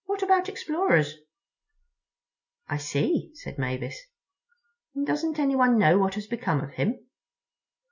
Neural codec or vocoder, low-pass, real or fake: none; 7.2 kHz; real